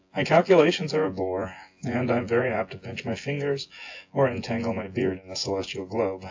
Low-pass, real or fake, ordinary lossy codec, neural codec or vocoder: 7.2 kHz; fake; AAC, 48 kbps; vocoder, 24 kHz, 100 mel bands, Vocos